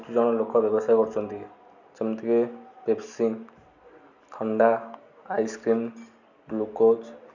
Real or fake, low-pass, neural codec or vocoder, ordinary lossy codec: real; 7.2 kHz; none; none